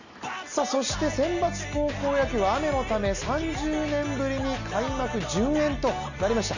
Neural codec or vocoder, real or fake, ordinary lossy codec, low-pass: none; real; none; 7.2 kHz